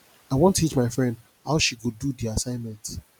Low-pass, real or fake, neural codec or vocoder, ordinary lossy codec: none; real; none; none